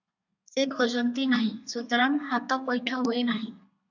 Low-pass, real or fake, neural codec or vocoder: 7.2 kHz; fake; codec, 32 kHz, 1.9 kbps, SNAC